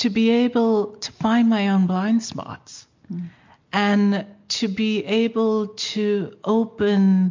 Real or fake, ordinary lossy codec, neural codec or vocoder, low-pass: real; MP3, 48 kbps; none; 7.2 kHz